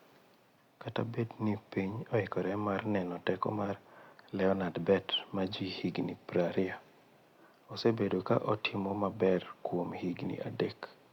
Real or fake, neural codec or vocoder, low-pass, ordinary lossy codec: real; none; 19.8 kHz; Opus, 64 kbps